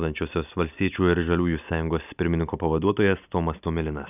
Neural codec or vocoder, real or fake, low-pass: none; real; 3.6 kHz